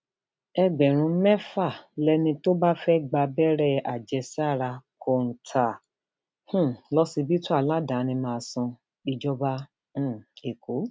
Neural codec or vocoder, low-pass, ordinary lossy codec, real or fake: none; none; none; real